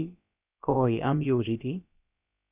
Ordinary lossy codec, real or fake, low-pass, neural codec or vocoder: Opus, 64 kbps; fake; 3.6 kHz; codec, 16 kHz, about 1 kbps, DyCAST, with the encoder's durations